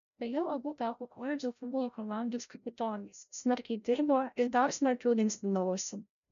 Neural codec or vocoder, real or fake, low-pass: codec, 16 kHz, 0.5 kbps, FreqCodec, larger model; fake; 7.2 kHz